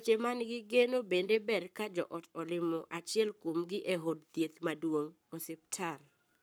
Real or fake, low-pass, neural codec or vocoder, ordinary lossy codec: fake; none; codec, 44.1 kHz, 7.8 kbps, Pupu-Codec; none